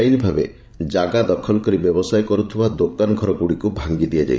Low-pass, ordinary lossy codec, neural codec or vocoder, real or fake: none; none; codec, 16 kHz, 16 kbps, FreqCodec, larger model; fake